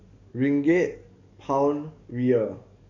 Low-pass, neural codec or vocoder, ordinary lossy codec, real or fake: 7.2 kHz; codec, 44.1 kHz, 7.8 kbps, DAC; none; fake